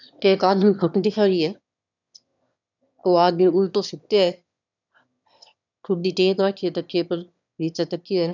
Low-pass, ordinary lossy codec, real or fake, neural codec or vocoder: 7.2 kHz; none; fake; autoencoder, 22.05 kHz, a latent of 192 numbers a frame, VITS, trained on one speaker